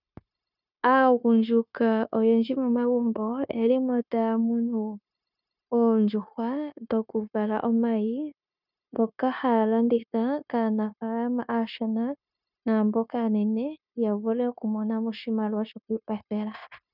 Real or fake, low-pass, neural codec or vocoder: fake; 5.4 kHz; codec, 16 kHz, 0.9 kbps, LongCat-Audio-Codec